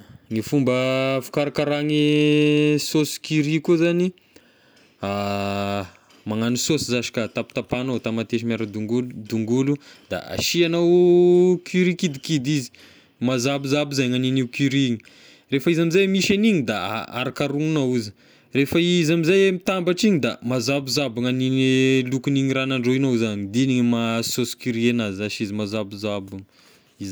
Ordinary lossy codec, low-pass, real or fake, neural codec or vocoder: none; none; real; none